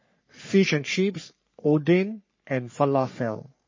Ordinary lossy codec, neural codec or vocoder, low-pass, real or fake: MP3, 32 kbps; codec, 44.1 kHz, 3.4 kbps, Pupu-Codec; 7.2 kHz; fake